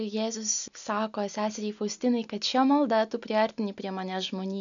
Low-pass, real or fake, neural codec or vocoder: 7.2 kHz; real; none